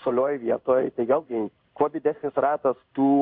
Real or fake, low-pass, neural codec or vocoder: fake; 5.4 kHz; codec, 16 kHz in and 24 kHz out, 1 kbps, XY-Tokenizer